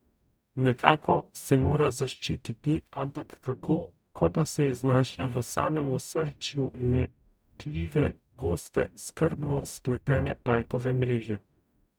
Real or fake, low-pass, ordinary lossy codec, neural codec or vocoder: fake; none; none; codec, 44.1 kHz, 0.9 kbps, DAC